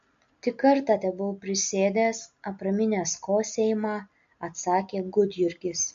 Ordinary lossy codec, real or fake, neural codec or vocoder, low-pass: MP3, 48 kbps; real; none; 7.2 kHz